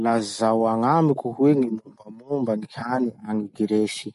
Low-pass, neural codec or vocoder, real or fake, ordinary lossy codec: 14.4 kHz; none; real; MP3, 48 kbps